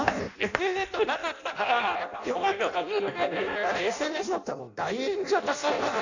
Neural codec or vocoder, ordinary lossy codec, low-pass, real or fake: codec, 16 kHz in and 24 kHz out, 0.6 kbps, FireRedTTS-2 codec; none; 7.2 kHz; fake